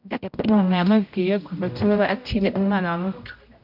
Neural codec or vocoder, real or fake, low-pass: codec, 16 kHz, 0.5 kbps, X-Codec, HuBERT features, trained on general audio; fake; 5.4 kHz